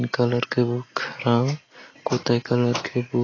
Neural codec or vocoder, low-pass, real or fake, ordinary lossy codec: none; 7.2 kHz; real; AAC, 32 kbps